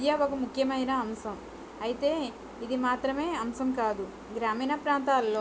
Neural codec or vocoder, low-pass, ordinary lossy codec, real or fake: none; none; none; real